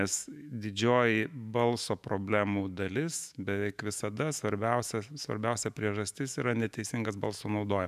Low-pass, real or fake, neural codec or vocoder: 14.4 kHz; fake; autoencoder, 48 kHz, 128 numbers a frame, DAC-VAE, trained on Japanese speech